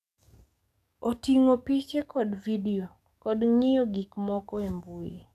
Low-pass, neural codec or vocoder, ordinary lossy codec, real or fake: 14.4 kHz; codec, 44.1 kHz, 7.8 kbps, DAC; none; fake